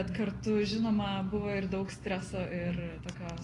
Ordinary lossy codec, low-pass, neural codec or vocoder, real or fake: AAC, 32 kbps; 10.8 kHz; none; real